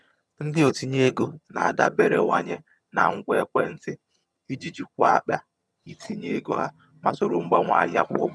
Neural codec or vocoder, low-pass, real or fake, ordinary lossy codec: vocoder, 22.05 kHz, 80 mel bands, HiFi-GAN; none; fake; none